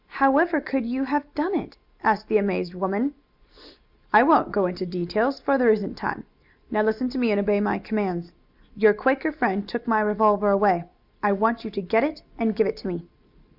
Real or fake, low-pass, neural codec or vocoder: real; 5.4 kHz; none